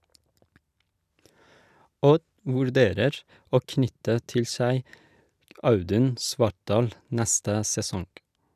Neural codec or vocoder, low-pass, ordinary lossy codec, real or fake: none; 14.4 kHz; none; real